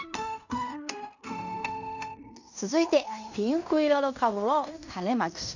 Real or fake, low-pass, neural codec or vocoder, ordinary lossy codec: fake; 7.2 kHz; codec, 16 kHz in and 24 kHz out, 0.9 kbps, LongCat-Audio-Codec, fine tuned four codebook decoder; none